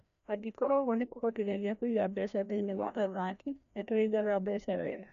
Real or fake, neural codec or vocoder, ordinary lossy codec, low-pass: fake; codec, 16 kHz, 1 kbps, FreqCodec, larger model; none; 7.2 kHz